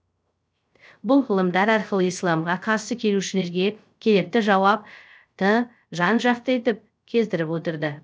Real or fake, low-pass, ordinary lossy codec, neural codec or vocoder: fake; none; none; codec, 16 kHz, 0.3 kbps, FocalCodec